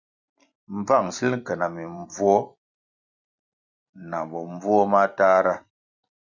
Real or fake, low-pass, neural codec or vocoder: fake; 7.2 kHz; vocoder, 44.1 kHz, 128 mel bands every 256 samples, BigVGAN v2